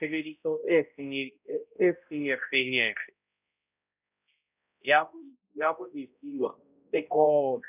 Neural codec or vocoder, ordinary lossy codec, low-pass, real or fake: codec, 16 kHz, 0.5 kbps, X-Codec, HuBERT features, trained on balanced general audio; none; 3.6 kHz; fake